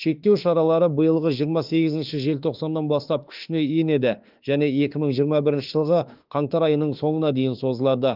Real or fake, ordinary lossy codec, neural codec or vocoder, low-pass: fake; Opus, 32 kbps; autoencoder, 48 kHz, 32 numbers a frame, DAC-VAE, trained on Japanese speech; 5.4 kHz